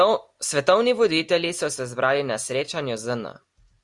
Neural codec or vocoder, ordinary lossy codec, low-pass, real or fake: none; Opus, 64 kbps; 10.8 kHz; real